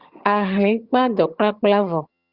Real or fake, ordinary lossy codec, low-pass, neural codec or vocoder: fake; Opus, 64 kbps; 5.4 kHz; vocoder, 22.05 kHz, 80 mel bands, HiFi-GAN